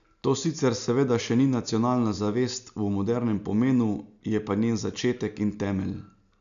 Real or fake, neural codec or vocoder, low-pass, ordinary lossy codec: real; none; 7.2 kHz; none